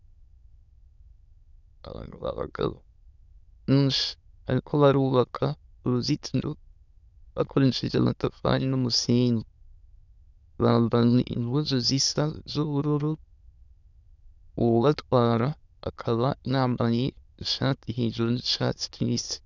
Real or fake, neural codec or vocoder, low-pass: fake; autoencoder, 22.05 kHz, a latent of 192 numbers a frame, VITS, trained on many speakers; 7.2 kHz